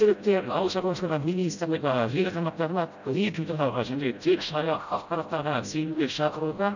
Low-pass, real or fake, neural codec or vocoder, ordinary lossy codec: 7.2 kHz; fake; codec, 16 kHz, 0.5 kbps, FreqCodec, smaller model; none